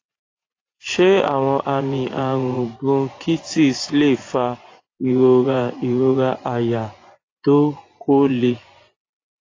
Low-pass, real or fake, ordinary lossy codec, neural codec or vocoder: 7.2 kHz; fake; AAC, 32 kbps; vocoder, 24 kHz, 100 mel bands, Vocos